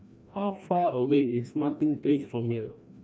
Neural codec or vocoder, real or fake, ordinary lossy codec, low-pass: codec, 16 kHz, 1 kbps, FreqCodec, larger model; fake; none; none